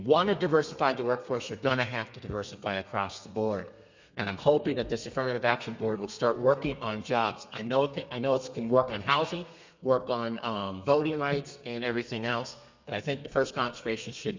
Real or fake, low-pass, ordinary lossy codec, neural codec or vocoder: fake; 7.2 kHz; MP3, 64 kbps; codec, 32 kHz, 1.9 kbps, SNAC